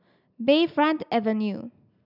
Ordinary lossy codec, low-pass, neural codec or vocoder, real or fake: none; 5.4 kHz; none; real